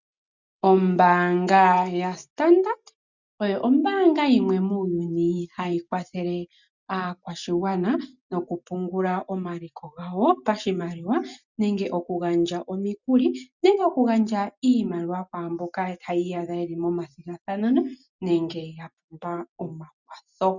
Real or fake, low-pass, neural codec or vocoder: real; 7.2 kHz; none